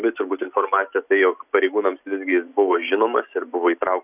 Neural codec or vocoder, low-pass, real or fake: none; 3.6 kHz; real